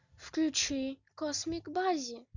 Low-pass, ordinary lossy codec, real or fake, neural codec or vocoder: 7.2 kHz; Opus, 64 kbps; real; none